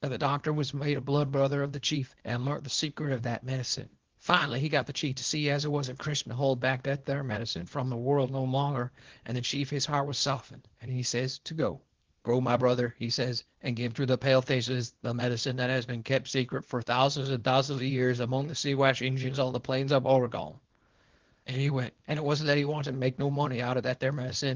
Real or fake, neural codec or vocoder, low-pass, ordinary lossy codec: fake; codec, 24 kHz, 0.9 kbps, WavTokenizer, small release; 7.2 kHz; Opus, 16 kbps